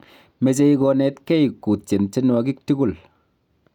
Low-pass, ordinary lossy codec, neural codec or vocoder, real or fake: 19.8 kHz; none; none; real